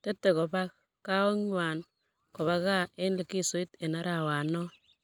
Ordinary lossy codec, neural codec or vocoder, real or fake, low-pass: none; none; real; none